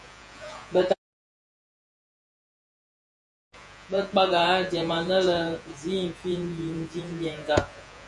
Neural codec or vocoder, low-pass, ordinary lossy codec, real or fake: vocoder, 48 kHz, 128 mel bands, Vocos; 10.8 kHz; MP3, 64 kbps; fake